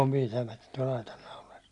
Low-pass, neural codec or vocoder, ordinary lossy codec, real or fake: 10.8 kHz; none; none; real